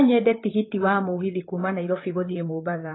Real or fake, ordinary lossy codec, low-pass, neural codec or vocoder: fake; AAC, 16 kbps; 7.2 kHz; vocoder, 44.1 kHz, 128 mel bands, Pupu-Vocoder